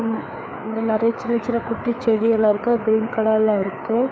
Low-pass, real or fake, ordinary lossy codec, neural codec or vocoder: none; fake; none; codec, 16 kHz, 4 kbps, FreqCodec, larger model